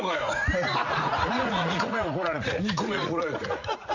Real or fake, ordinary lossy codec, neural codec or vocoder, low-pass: fake; none; vocoder, 44.1 kHz, 80 mel bands, Vocos; 7.2 kHz